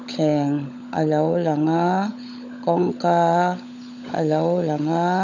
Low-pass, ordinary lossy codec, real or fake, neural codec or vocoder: 7.2 kHz; none; fake; codec, 16 kHz, 16 kbps, FunCodec, trained on LibriTTS, 50 frames a second